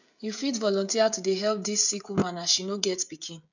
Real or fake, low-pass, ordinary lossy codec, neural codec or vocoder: fake; 7.2 kHz; none; codec, 16 kHz, 16 kbps, FreqCodec, smaller model